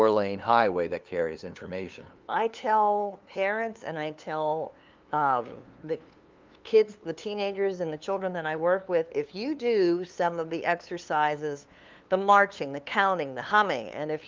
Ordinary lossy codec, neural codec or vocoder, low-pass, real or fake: Opus, 24 kbps; codec, 16 kHz, 2 kbps, FunCodec, trained on LibriTTS, 25 frames a second; 7.2 kHz; fake